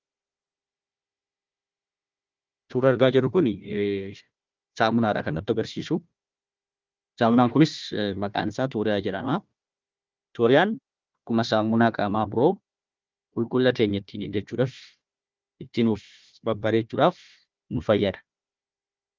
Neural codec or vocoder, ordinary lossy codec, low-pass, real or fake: codec, 16 kHz, 1 kbps, FunCodec, trained on Chinese and English, 50 frames a second; Opus, 24 kbps; 7.2 kHz; fake